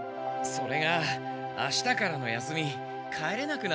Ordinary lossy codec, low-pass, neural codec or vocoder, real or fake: none; none; none; real